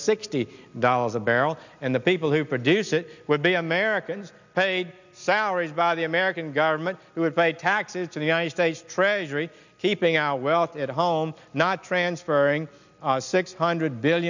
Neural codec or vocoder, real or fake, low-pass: none; real; 7.2 kHz